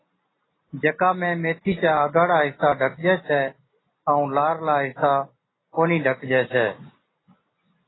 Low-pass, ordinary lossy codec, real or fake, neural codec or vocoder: 7.2 kHz; AAC, 16 kbps; real; none